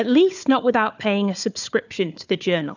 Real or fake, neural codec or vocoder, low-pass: fake; codec, 16 kHz, 4 kbps, FunCodec, trained on Chinese and English, 50 frames a second; 7.2 kHz